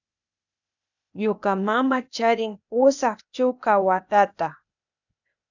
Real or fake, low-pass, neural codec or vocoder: fake; 7.2 kHz; codec, 16 kHz, 0.8 kbps, ZipCodec